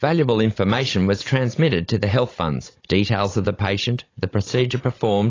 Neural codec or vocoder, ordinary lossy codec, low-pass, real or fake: codec, 16 kHz, 16 kbps, FunCodec, trained on Chinese and English, 50 frames a second; AAC, 32 kbps; 7.2 kHz; fake